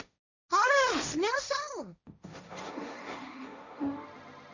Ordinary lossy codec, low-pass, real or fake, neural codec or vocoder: none; 7.2 kHz; fake; codec, 16 kHz, 1.1 kbps, Voila-Tokenizer